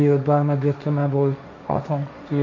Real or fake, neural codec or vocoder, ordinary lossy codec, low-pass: fake; codec, 16 kHz, 1.1 kbps, Voila-Tokenizer; MP3, 48 kbps; 7.2 kHz